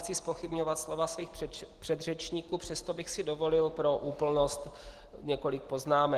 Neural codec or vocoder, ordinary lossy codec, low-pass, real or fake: none; Opus, 16 kbps; 14.4 kHz; real